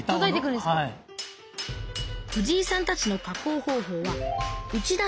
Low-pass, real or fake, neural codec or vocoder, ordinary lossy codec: none; real; none; none